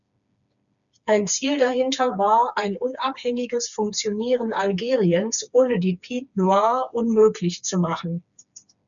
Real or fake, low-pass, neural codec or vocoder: fake; 7.2 kHz; codec, 16 kHz, 4 kbps, FreqCodec, smaller model